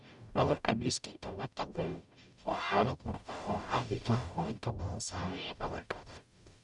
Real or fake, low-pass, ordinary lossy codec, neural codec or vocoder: fake; 10.8 kHz; none; codec, 44.1 kHz, 0.9 kbps, DAC